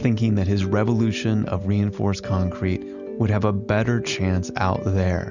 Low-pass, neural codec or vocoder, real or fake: 7.2 kHz; none; real